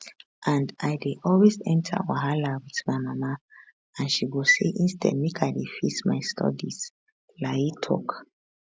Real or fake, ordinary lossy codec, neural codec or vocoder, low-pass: real; none; none; none